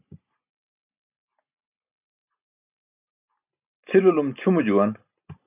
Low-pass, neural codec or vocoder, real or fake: 3.6 kHz; none; real